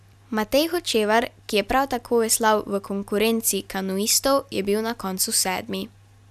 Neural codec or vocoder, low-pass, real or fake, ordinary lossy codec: none; 14.4 kHz; real; none